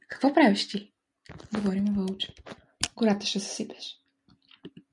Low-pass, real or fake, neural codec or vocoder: 10.8 kHz; real; none